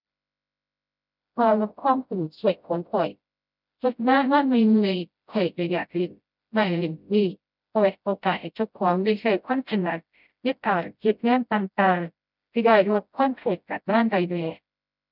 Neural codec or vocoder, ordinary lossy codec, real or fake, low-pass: codec, 16 kHz, 0.5 kbps, FreqCodec, smaller model; none; fake; 5.4 kHz